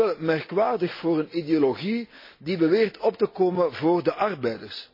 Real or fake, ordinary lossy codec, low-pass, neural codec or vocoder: real; MP3, 24 kbps; 5.4 kHz; none